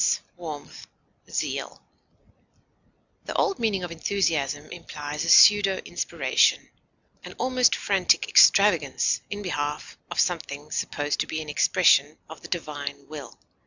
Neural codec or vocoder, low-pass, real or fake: none; 7.2 kHz; real